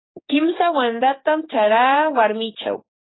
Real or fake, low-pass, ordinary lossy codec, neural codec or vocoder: fake; 7.2 kHz; AAC, 16 kbps; codec, 16 kHz, 4 kbps, X-Codec, HuBERT features, trained on general audio